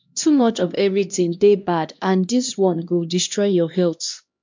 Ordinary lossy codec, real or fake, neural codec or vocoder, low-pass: MP3, 64 kbps; fake; codec, 16 kHz, 1 kbps, X-Codec, HuBERT features, trained on LibriSpeech; 7.2 kHz